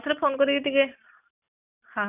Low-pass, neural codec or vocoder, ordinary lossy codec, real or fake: 3.6 kHz; none; none; real